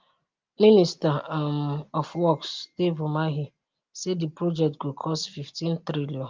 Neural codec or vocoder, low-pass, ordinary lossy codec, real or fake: none; 7.2 kHz; Opus, 32 kbps; real